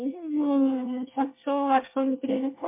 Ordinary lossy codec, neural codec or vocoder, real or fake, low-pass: MP3, 24 kbps; codec, 24 kHz, 1 kbps, SNAC; fake; 3.6 kHz